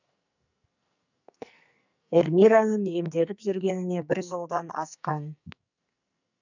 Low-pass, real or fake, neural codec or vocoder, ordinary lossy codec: 7.2 kHz; fake; codec, 44.1 kHz, 2.6 kbps, SNAC; none